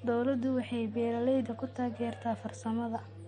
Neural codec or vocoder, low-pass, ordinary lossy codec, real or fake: none; 9.9 kHz; MP3, 48 kbps; real